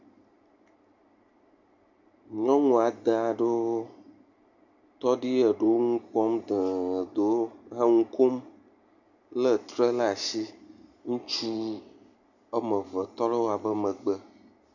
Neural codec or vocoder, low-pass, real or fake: none; 7.2 kHz; real